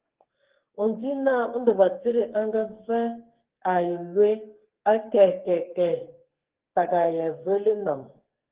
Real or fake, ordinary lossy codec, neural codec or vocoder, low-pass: fake; Opus, 16 kbps; codec, 44.1 kHz, 2.6 kbps, SNAC; 3.6 kHz